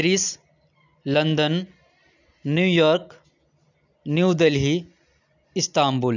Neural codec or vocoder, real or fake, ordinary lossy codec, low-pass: none; real; none; 7.2 kHz